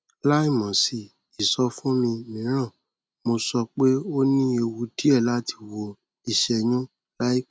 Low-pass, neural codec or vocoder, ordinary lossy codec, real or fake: none; none; none; real